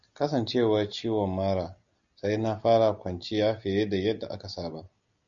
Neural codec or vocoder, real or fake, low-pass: none; real; 7.2 kHz